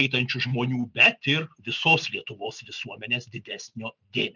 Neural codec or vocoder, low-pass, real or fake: none; 7.2 kHz; real